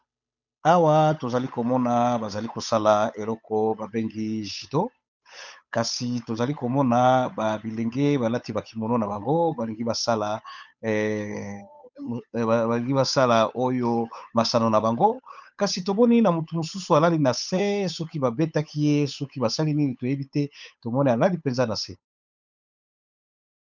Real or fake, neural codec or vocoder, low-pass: fake; codec, 16 kHz, 8 kbps, FunCodec, trained on Chinese and English, 25 frames a second; 7.2 kHz